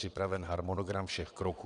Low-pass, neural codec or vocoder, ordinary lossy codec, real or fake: 9.9 kHz; vocoder, 22.05 kHz, 80 mel bands, WaveNeXt; Opus, 64 kbps; fake